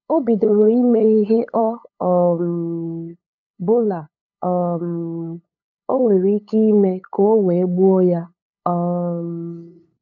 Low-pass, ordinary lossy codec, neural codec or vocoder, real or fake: 7.2 kHz; none; codec, 16 kHz, 8 kbps, FunCodec, trained on LibriTTS, 25 frames a second; fake